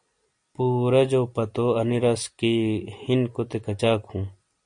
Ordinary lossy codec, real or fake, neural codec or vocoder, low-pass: MP3, 64 kbps; real; none; 9.9 kHz